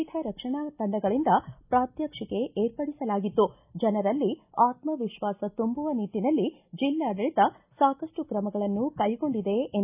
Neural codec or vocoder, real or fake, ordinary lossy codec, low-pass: none; real; AAC, 32 kbps; 3.6 kHz